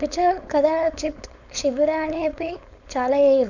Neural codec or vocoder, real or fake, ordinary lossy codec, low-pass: codec, 16 kHz, 4.8 kbps, FACodec; fake; none; 7.2 kHz